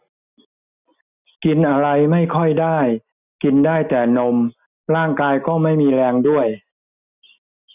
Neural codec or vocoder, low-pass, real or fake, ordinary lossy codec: none; 3.6 kHz; real; none